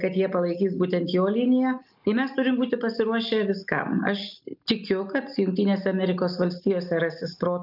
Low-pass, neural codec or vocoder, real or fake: 5.4 kHz; none; real